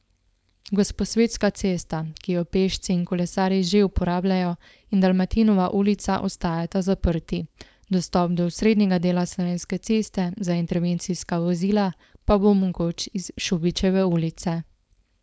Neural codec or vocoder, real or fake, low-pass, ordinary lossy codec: codec, 16 kHz, 4.8 kbps, FACodec; fake; none; none